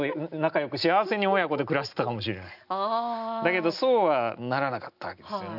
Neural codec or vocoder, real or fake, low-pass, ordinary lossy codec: none; real; 5.4 kHz; none